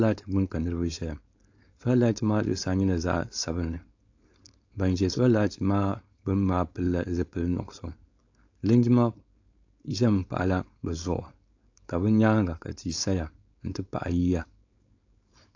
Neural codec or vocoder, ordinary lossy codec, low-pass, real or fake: codec, 16 kHz, 4.8 kbps, FACodec; AAC, 48 kbps; 7.2 kHz; fake